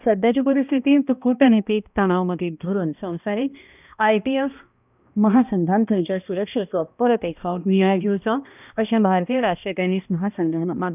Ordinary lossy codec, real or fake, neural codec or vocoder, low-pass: none; fake; codec, 16 kHz, 1 kbps, X-Codec, HuBERT features, trained on balanced general audio; 3.6 kHz